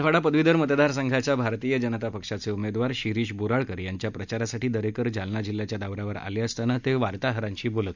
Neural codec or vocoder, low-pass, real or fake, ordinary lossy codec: none; 7.2 kHz; real; none